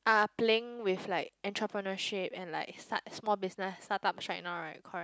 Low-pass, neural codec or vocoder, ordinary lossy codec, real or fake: none; none; none; real